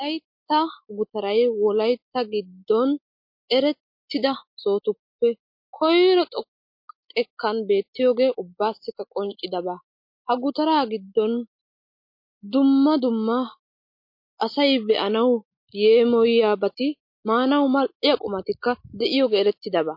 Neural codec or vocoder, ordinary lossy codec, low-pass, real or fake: none; MP3, 32 kbps; 5.4 kHz; real